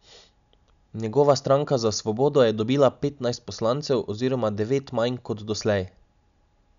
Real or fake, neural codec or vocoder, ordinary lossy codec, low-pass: real; none; none; 7.2 kHz